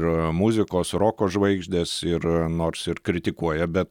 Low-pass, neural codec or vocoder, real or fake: 19.8 kHz; none; real